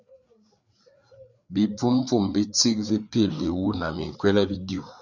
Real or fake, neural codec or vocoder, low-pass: fake; codec, 16 kHz, 4 kbps, FreqCodec, larger model; 7.2 kHz